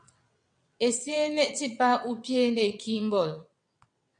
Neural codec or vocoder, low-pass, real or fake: vocoder, 22.05 kHz, 80 mel bands, WaveNeXt; 9.9 kHz; fake